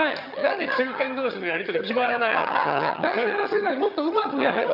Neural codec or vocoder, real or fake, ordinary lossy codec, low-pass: vocoder, 22.05 kHz, 80 mel bands, HiFi-GAN; fake; none; 5.4 kHz